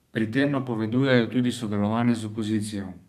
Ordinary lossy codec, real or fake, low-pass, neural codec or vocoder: none; fake; 14.4 kHz; codec, 32 kHz, 1.9 kbps, SNAC